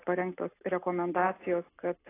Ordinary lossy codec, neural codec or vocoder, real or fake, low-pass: AAC, 16 kbps; none; real; 3.6 kHz